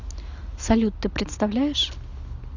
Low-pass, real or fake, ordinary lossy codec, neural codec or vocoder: 7.2 kHz; real; Opus, 64 kbps; none